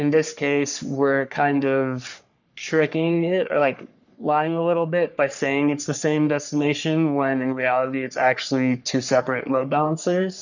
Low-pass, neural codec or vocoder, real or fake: 7.2 kHz; codec, 44.1 kHz, 3.4 kbps, Pupu-Codec; fake